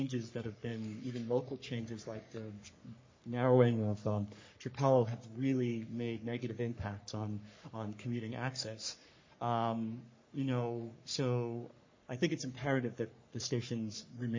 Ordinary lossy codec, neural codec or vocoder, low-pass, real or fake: MP3, 32 kbps; codec, 44.1 kHz, 3.4 kbps, Pupu-Codec; 7.2 kHz; fake